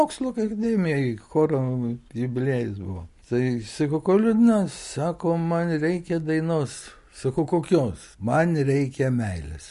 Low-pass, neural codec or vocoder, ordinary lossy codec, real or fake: 14.4 kHz; none; MP3, 48 kbps; real